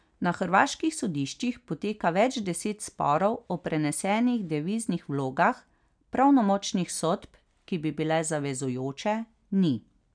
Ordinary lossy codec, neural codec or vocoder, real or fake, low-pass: none; none; real; 9.9 kHz